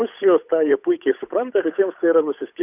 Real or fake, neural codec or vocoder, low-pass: fake; codec, 16 kHz, 8 kbps, FunCodec, trained on Chinese and English, 25 frames a second; 3.6 kHz